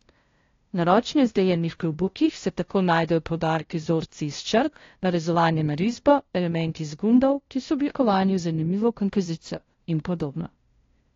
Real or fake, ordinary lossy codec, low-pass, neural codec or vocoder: fake; AAC, 32 kbps; 7.2 kHz; codec, 16 kHz, 0.5 kbps, FunCodec, trained on LibriTTS, 25 frames a second